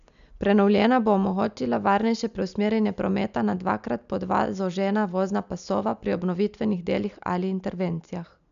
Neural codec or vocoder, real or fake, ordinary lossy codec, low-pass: none; real; none; 7.2 kHz